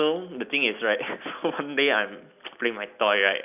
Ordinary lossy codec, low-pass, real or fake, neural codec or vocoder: none; 3.6 kHz; real; none